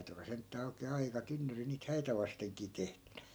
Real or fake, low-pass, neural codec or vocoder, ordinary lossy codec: real; none; none; none